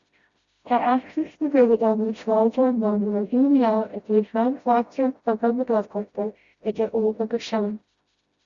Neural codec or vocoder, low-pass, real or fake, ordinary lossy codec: codec, 16 kHz, 0.5 kbps, FreqCodec, smaller model; 7.2 kHz; fake; Opus, 64 kbps